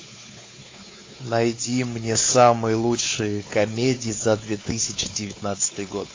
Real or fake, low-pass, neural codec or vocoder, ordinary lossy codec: fake; 7.2 kHz; codec, 16 kHz, 4 kbps, X-Codec, WavLM features, trained on Multilingual LibriSpeech; AAC, 32 kbps